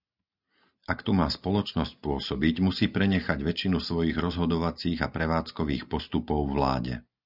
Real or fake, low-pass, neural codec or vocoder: real; 5.4 kHz; none